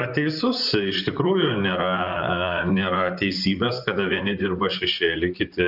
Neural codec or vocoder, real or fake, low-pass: vocoder, 44.1 kHz, 128 mel bands, Pupu-Vocoder; fake; 5.4 kHz